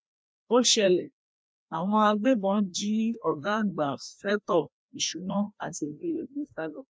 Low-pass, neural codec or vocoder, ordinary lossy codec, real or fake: none; codec, 16 kHz, 1 kbps, FreqCodec, larger model; none; fake